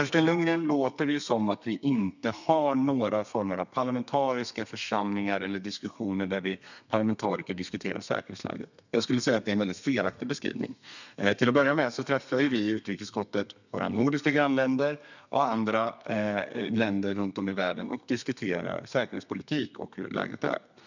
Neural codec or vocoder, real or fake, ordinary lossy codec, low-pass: codec, 32 kHz, 1.9 kbps, SNAC; fake; none; 7.2 kHz